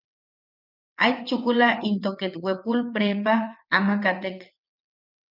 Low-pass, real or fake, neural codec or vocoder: 5.4 kHz; fake; vocoder, 22.05 kHz, 80 mel bands, WaveNeXt